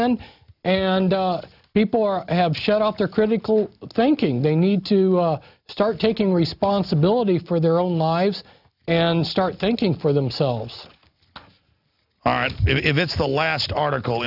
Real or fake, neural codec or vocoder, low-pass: real; none; 5.4 kHz